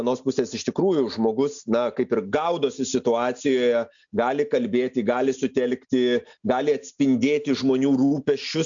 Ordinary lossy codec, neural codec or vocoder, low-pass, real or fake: MP3, 64 kbps; none; 7.2 kHz; real